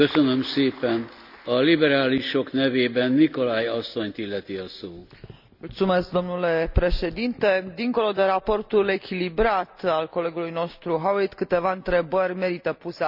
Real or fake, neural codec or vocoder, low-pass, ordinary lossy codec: real; none; 5.4 kHz; none